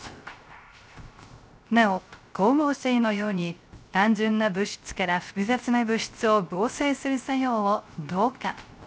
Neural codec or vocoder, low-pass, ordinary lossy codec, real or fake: codec, 16 kHz, 0.3 kbps, FocalCodec; none; none; fake